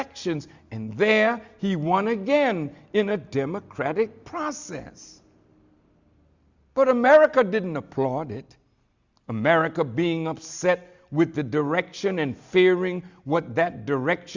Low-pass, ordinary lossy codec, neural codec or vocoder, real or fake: 7.2 kHz; Opus, 64 kbps; none; real